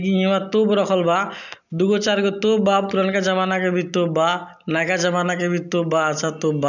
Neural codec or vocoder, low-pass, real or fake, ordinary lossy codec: none; 7.2 kHz; real; none